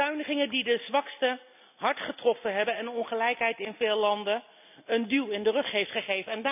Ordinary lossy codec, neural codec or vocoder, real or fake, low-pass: none; none; real; 3.6 kHz